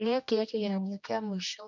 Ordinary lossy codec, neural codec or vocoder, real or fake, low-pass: none; codec, 16 kHz, 1 kbps, X-Codec, HuBERT features, trained on general audio; fake; 7.2 kHz